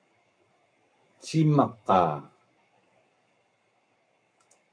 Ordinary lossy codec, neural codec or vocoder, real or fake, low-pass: AAC, 64 kbps; codec, 44.1 kHz, 7.8 kbps, Pupu-Codec; fake; 9.9 kHz